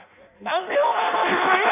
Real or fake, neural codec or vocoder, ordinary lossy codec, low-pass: fake; codec, 16 kHz in and 24 kHz out, 0.6 kbps, FireRedTTS-2 codec; none; 3.6 kHz